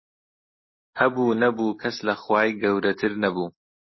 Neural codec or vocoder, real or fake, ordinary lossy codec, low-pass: none; real; MP3, 24 kbps; 7.2 kHz